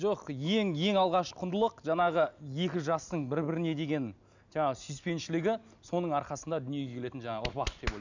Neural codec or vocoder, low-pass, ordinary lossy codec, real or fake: none; 7.2 kHz; none; real